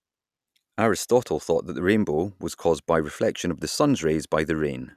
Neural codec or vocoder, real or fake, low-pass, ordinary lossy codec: none; real; 14.4 kHz; none